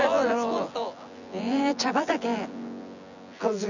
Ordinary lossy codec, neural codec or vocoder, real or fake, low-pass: none; vocoder, 24 kHz, 100 mel bands, Vocos; fake; 7.2 kHz